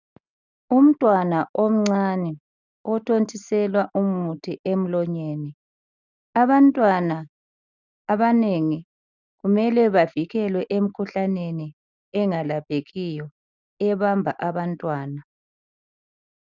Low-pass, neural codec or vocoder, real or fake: 7.2 kHz; none; real